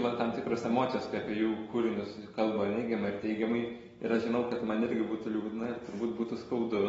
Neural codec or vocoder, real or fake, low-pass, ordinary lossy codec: none; real; 19.8 kHz; AAC, 24 kbps